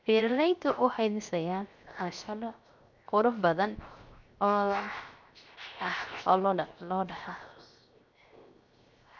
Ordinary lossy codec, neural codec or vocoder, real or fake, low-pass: none; codec, 16 kHz, 0.7 kbps, FocalCodec; fake; 7.2 kHz